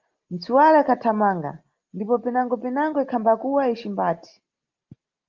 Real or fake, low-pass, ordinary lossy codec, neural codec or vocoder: real; 7.2 kHz; Opus, 32 kbps; none